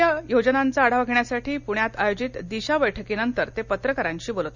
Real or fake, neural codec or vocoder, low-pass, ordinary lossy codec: real; none; 7.2 kHz; none